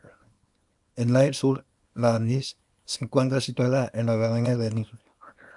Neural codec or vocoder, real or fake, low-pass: codec, 24 kHz, 0.9 kbps, WavTokenizer, small release; fake; 10.8 kHz